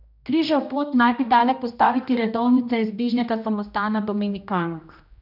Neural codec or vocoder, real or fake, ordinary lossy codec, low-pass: codec, 16 kHz, 1 kbps, X-Codec, HuBERT features, trained on general audio; fake; none; 5.4 kHz